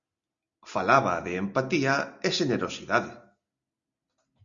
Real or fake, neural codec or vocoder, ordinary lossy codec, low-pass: real; none; Opus, 64 kbps; 7.2 kHz